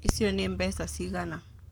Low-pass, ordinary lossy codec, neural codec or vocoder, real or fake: none; none; codec, 44.1 kHz, 7.8 kbps, Pupu-Codec; fake